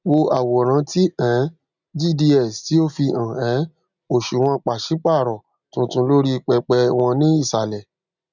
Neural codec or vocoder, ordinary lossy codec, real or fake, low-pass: none; none; real; 7.2 kHz